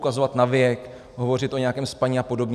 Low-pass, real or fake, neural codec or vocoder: 14.4 kHz; real; none